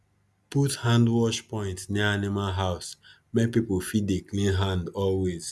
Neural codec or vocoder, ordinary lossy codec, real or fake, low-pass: none; none; real; none